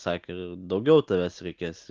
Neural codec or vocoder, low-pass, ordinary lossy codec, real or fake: none; 7.2 kHz; Opus, 24 kbps; real